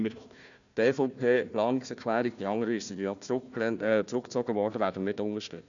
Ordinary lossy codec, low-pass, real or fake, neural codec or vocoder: none; 7.2 kHz; fake; codec, 16 kHz, 1 kbps, FunCodec, trained on Chinese and English, 50 frames a second